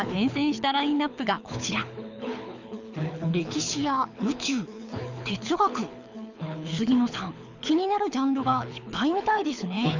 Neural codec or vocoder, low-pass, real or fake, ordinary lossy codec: codec, 24 kHz, 6 kbps, HILCodec; 7.2 kHz; fake; none